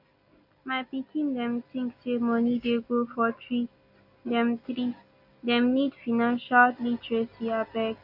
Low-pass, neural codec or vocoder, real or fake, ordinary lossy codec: 5.4 kHz; none; real; none